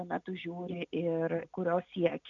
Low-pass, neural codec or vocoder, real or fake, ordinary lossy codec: 7.2 kHz; none; real; MP3, 96 kbps